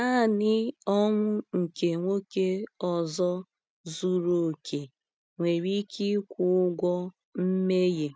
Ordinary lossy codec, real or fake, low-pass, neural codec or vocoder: none; real; none; none